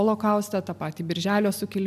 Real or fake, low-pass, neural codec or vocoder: real; 14.4 kHz; none